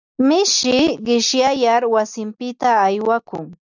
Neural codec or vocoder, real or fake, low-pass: none; real; 7.2 kHz